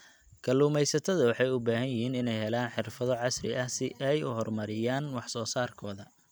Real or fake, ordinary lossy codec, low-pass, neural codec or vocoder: real; none; none; none